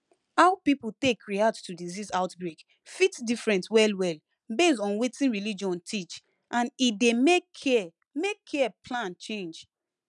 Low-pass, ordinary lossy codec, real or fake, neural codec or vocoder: 10.8 kHz; none; real; none